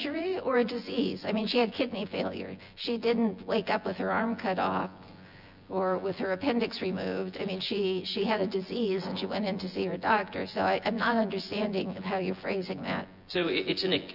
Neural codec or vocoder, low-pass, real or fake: vocoder, 24 kHz, 100 mel bands, Vocos; 5.4 kHz; fake